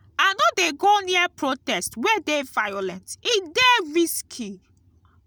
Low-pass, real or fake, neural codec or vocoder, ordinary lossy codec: none; real; none; none